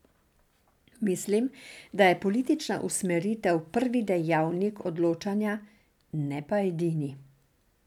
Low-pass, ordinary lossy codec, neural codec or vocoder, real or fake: 19.8 kHz; none; none; real